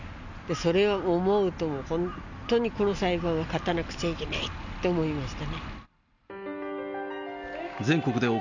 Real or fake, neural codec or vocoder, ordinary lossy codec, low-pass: real; none; none; 7.2 kHz